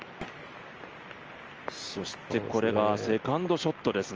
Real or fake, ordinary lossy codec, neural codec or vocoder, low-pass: real; Opus, 24 kbps; none; 7.2 kHz